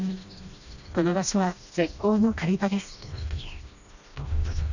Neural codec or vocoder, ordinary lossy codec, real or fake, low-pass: codec, 16 kHz, 1 kbps, FreqCodec, smaller model; none; fake; 7.2 kHz